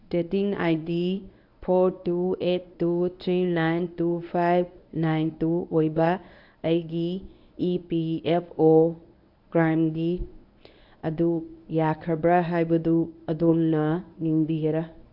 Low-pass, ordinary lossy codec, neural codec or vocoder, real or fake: 5.4 kHz; AAC, 48 kbps; codec, 24 kHz, 0.9 kbps, WavTokenizer, medium speech release version 1; fake